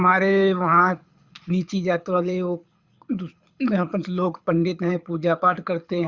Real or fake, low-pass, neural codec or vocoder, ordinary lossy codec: fake; 7.2 kHz; codec, 24 kHz, 6 kbps, HILCodec; Opus, 64 kbps